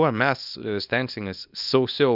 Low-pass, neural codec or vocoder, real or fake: 5.4 kHz; codec, 24 kHz, 0.9 kbps, WavTokenizer, small release; fake